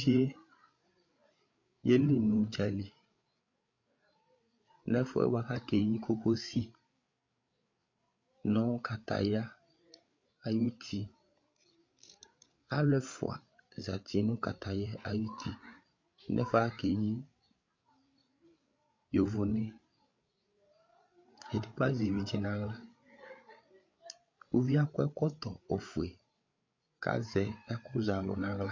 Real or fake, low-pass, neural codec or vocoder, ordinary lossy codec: fake; 7.2 kHz; codec, 16 kHz, 8 kbps, FreqCodec, larger model; MP3, 48 kbps